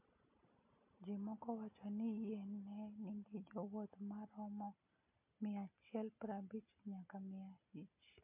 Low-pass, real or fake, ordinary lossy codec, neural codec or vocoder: 3.6 kHz; real; MP3, 32 kbps; none